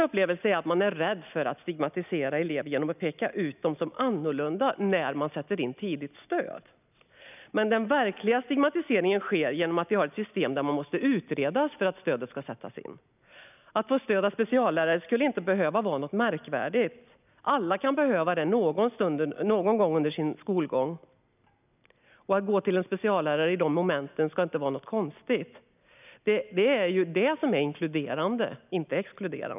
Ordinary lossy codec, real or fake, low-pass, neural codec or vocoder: none; real; 3.6 kHz; none